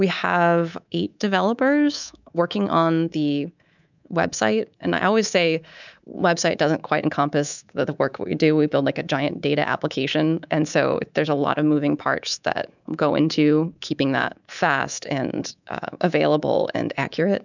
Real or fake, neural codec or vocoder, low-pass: fake; codec, 24 kHz, 3.1 kbps, DualCodec; 7.2 kHz